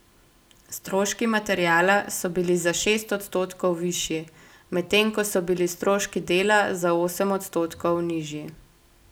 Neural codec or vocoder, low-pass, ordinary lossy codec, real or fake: none; none; none; real